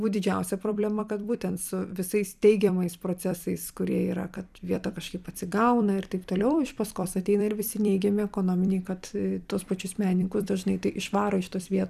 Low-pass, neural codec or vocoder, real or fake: 14.4 kHz; vocoder, 44.1 kHz, 128 mel bands every 256 samples, BigVGAN v2; fake